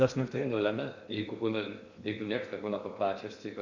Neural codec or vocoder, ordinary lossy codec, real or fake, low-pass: codec, 16 kHz in and 24 kHz out, 0.6 kbps, FocalCodec, streaming, 2048 codes; Opus, 64 kbps; fake; 7.2 kHz